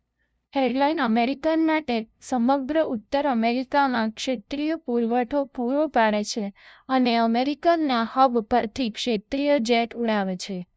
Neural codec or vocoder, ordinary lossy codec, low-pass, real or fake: codec, 16 kHz, 0.5 kbps, FunCodec, trained on LibriTTS, 25 frames a second; none; none; fake